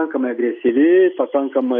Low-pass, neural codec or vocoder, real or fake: 7.2 kHz; none; real